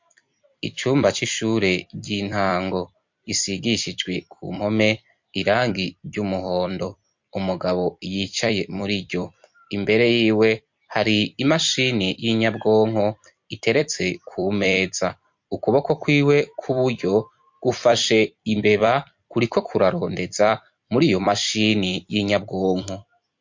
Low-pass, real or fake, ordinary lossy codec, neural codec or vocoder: 7.2 kHz; fake; MP3, 48 kbps; vocoder, 44.1 kHz, 128 mel bands every 256 samples, BigVGAN v2